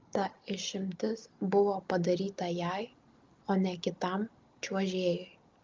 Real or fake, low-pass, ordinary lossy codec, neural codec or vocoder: real; 7.2 kHz; Opus, 32 kbps; none